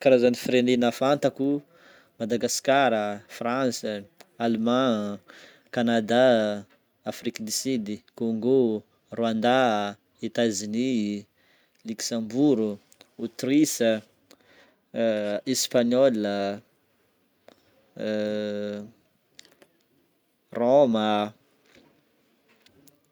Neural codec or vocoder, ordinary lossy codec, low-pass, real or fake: none; none; none; real